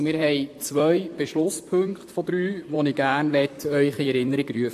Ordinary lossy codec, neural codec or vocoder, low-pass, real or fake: AAC, 64 kbps; vocoder, 44.1 kHz, 128 mel bands, Pupu-Vocoder; 14.4 kHz; fake